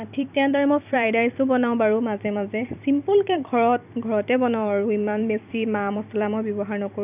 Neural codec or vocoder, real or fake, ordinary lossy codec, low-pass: vocoder, 44.1 kHz, 128 mel bands every 256 samples, BigVGAN v2; fake; none; 3.6 kHz